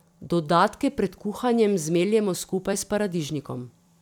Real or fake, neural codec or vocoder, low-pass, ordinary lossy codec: fake; vocoder, 44.1 kHz, 128 mel bands every 256 samples, BigVGAN v2; 19.8 kHz; none